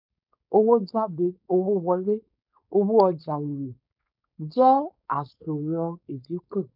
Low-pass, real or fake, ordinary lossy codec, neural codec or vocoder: 5.4 kHz; fake; none; codec, 16 kHz, 4.8 kbps, FACodec